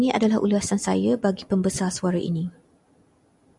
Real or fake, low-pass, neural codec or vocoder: real; 10.8 kHz; none